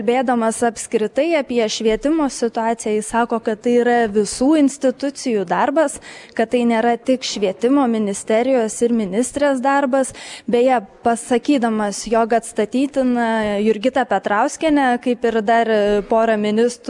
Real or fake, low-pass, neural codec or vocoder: real; 10.8 kHz; none